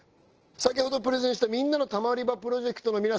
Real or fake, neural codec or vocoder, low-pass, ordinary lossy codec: real; none; 7.2 kHz; Opus, 16 kbps